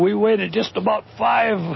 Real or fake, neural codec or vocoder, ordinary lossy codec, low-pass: real; none; MP3, 24 kbps; 7.2 kHz